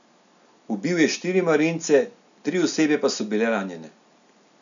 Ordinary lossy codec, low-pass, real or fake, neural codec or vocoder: none; 7.2 kHz; real; none